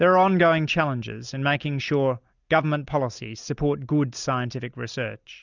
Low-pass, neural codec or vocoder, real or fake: 7.2 kHz; none; real